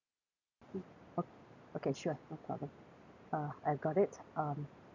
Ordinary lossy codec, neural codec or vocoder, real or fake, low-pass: none; none; real; 7.2 kHz